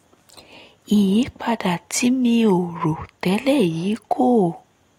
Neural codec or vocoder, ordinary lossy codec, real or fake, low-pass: none; AAC, 48 kbps; real; 19.8 kHz